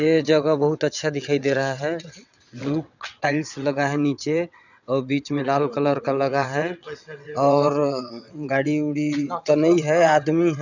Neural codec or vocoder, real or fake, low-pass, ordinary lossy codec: vocoder, 22.05 kHz, 80 mel bands, WaveNeXt; fake; 7.2 kHz; none